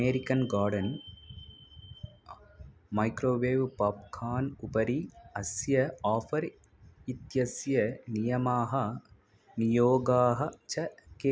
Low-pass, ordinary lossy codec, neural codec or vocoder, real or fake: none; none; none; real